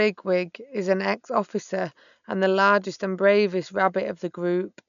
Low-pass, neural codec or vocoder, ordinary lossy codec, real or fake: 7.2 kHz; none; none; real